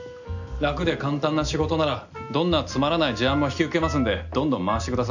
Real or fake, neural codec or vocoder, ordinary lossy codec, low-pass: real; none; none; 7.2 kHz